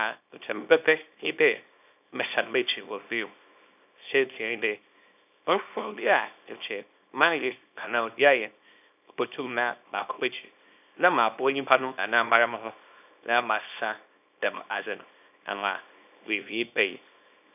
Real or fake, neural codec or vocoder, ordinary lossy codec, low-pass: fake; codec, 24 kHz, 0.9 kbps, WavTokenizer, small release; none; 3.6 kHz